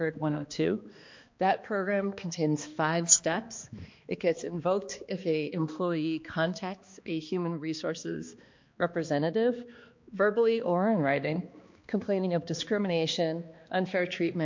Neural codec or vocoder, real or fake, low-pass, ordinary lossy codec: codec, 16 kHz, 2 kbps, X-Codec, HuBERT features, trained on balanced general audio; fake; 7.2 kHz; MP3, 48 kbps